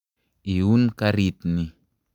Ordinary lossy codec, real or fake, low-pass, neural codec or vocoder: none; real; 19.8 kHz; none